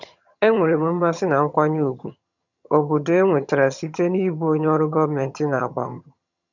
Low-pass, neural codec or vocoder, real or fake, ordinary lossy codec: 7.2 kHz; vocoder, 22.05 kHz, 80 mel bands, HiFi-GAN; fake; none